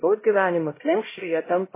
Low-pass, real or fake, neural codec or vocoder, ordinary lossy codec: 3.6 kHz; fake; codec, 16 kHz, 0.5 kbps, X-Codec, HuBERT features, trained on LibriSpeech; MP3, 16 kbps